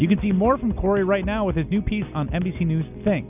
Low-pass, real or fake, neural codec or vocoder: 3.6 kHz; real; none